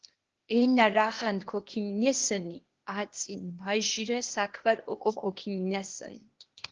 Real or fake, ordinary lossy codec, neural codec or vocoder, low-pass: fake; Opus, 16 kbps; codec, 16 kHz, 0.8 kbps, ZipCodec; 7.2 kHz